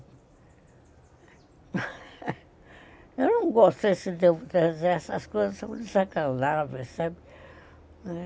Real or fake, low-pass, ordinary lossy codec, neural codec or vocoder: real; none; none; none